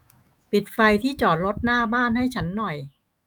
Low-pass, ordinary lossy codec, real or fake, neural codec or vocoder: none; none; fake; autoencoder, 48 kHz, 128 numbers a frame, DAC-VAE, trained on Japanese speech